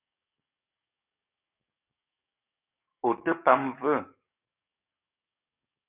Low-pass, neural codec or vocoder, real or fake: 3.6 kHz; codec, 44.1 kHz, 7.8 kbps, DAC; fake